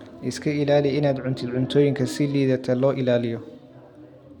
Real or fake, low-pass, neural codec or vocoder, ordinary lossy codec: real; 19.8 kHz; none; none